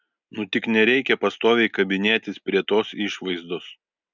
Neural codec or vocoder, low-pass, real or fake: none; 7.2 kHz; real